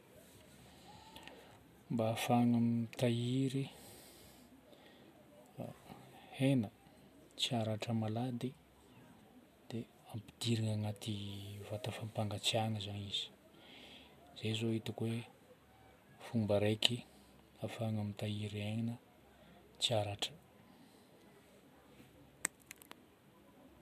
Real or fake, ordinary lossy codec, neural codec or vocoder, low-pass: real; none; none; 14.4 kHz